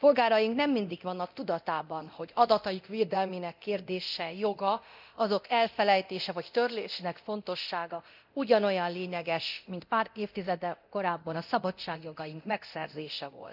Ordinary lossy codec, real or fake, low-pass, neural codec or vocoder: none; fake; 5.4 kHz; codec, 24 kHz, 0.9 kbps, DualCodec